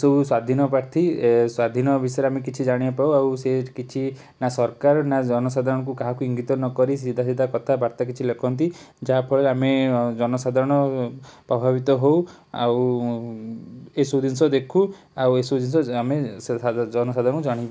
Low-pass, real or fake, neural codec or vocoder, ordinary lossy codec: none; real; none; none